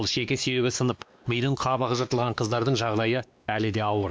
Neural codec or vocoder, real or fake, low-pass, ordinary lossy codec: codec, 16 kHz, 2 kbps, X-Codec, WavLM features, trained on Multilingual LibriSpeech; fake; none; none